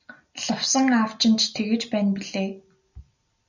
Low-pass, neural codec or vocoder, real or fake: 7.2 kHz; none; real